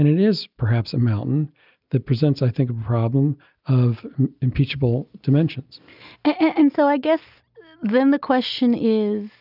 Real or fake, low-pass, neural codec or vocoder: real; 5.4 kHz; none